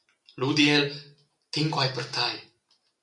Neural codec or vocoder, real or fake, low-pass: none; real; 10.8 kHz